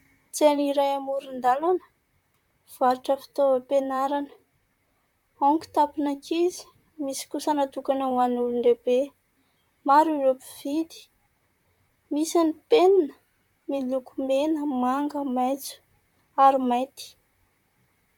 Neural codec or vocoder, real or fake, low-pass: vocoder, 44.1 kHz, 128 mel bands, Pupu-Vocoder; fake; 19.8 kHz